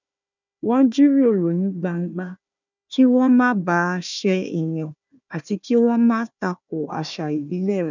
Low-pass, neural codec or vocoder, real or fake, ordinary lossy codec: 7.2 kHz; codec, 16 kHz, 1 kbps, FunCodec, trained on Chinese and English, 50 frames a second; fake; none